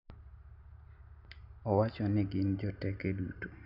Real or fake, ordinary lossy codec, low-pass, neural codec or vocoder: real; none; 5.4 kHz; none